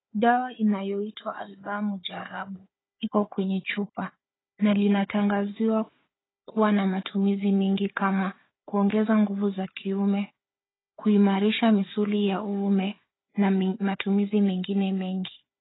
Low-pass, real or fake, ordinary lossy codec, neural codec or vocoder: 7.2 kHz; fake; AAC, 16 kbps; codec, 16 kHz, 4 kbps, FunCodec, trained on Chinese and English, 50 frames a second